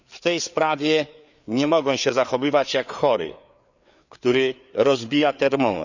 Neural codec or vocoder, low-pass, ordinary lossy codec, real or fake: codec, 16 kHz, 4 kbps, FreqCodec, larger model; 7.2 kHz; none; fake